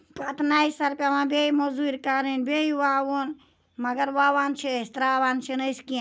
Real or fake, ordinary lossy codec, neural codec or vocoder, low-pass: real; none; none; none